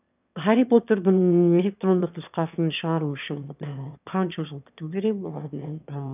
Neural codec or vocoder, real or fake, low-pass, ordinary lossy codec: autoencoder, 22.05 kHz, a latent of 192 numbers a frame, VITS, trained on one speaker; fake; 3.6 kHz; none